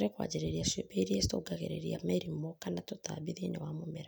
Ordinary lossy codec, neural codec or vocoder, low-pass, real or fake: none; none; none; real